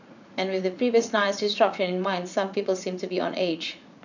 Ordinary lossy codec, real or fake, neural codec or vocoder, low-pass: none; fake; vocoder, 44.1 kHz, 80 mel bands, Vocos; 7.2 kHz